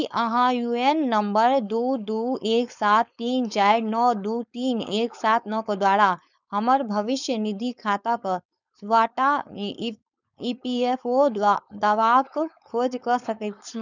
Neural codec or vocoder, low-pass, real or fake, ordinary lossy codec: codec, 16 kHz, 4.8 kbps, FACodec; 7.2 kHz; fake; none